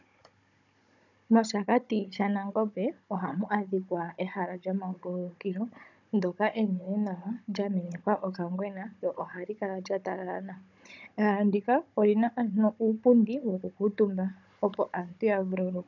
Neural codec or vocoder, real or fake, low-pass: codec, 16 kHz, 16 kbps, FunCodec, trained on Chinese and English, 50 frames a second; fake; 7.2 kHz